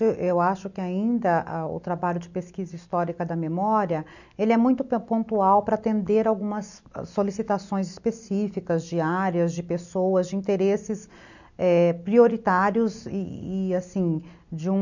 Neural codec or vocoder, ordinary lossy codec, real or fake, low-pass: none; none; real; 7.2 kHz